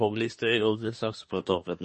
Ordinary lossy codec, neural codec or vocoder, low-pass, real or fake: MP3, 32 kbps; codec, 24 kHz, 1 kbps, SNAC; 10.8 kHz; fake